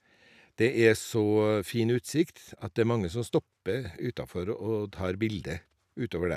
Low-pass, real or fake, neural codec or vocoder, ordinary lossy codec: 14.4 kHz; real; none; none